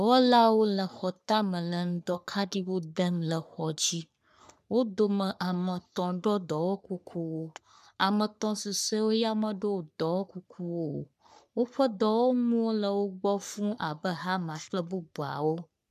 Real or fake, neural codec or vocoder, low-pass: fake; codec, 44.1 kHz, 3.4 kbps, Pupu-Codec; 14.4 kHz